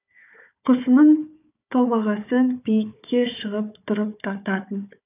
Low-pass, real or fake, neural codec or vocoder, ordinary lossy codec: 3.6 kHz; fake; codec, 16 kHz, 4 kbps, FunCodec, trained on Chinese and English, 50 frames a second; none